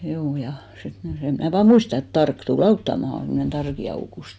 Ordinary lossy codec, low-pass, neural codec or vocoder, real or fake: none; none; none; real